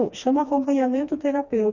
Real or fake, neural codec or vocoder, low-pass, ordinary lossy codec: fake; codec, 16 kHz, 2 kbps, FreqCodec, smaller model; 7.2 kHz; none